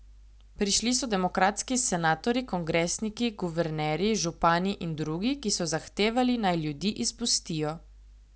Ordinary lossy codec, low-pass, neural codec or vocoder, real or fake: none; none; none; real